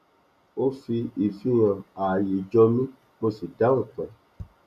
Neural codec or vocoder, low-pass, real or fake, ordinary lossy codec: none; 14.4 kHz; real; none